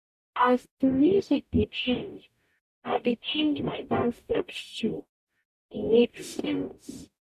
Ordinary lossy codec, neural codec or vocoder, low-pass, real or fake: none; codec, 44.1 kHz, 0.9 kbps, DAC; 14.4 kHz; fake